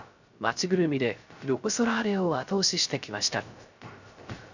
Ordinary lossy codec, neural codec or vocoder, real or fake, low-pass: none; codec, 16 kHz, 0.3 kbps, FocalCodec; fake; 7.2 kHz